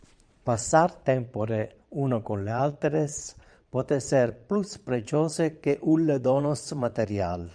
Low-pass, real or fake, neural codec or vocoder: 9.9 kHz; fake; vocoder, 22.05 kHz, 80 mel bands, Vocos